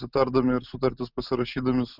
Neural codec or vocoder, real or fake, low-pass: none; real; 5.4 kHz